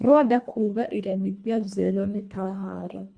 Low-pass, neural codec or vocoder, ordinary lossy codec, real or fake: 9.9 kHz; codec, 24 kHz, 1.5 kbps, HILCodec; Opus, 64 kbps; fake